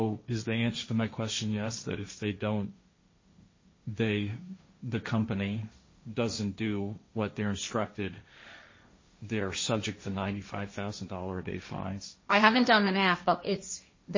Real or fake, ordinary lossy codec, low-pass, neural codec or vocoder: fake; MP3, 32 kbps; 7.2 kHz; codec, 16 kHz, 1.1 kbps, Voila-Tokenizer